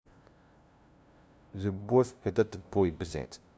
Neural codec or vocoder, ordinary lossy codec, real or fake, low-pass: codec, 16 kHz, 0.5 kbps, FunCodec, trained on LibriTTS, 25 frames a second; none; fake; none